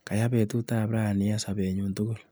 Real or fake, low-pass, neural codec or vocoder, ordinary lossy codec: real; none; none; none